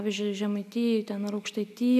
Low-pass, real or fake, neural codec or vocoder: 14.4 kHz; real; none